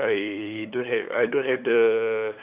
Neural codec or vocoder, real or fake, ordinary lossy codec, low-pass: codec, 16 kHz, 8 kbps, FunCodec, trained on LibriTTS, 25 frames a second; fake; Opus, 64 kbps; 3.6 kHz